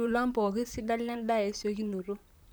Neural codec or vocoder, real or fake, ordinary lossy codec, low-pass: vocoder, 44.1 kHz, 128 mel bands, Pupu-Vocoder; fake; none; none